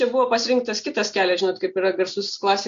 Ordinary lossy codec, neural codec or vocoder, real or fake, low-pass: MP3, 48 kbps; none; real; 7.2 kHz